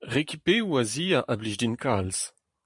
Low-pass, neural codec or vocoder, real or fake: 10.8 kHz; vocoder, 44.1 kHz, 128 mel bands every 512 samples, BigVGAN v2; fake